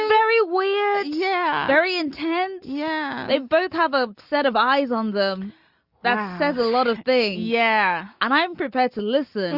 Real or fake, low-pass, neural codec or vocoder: real; 5.4 kHz; none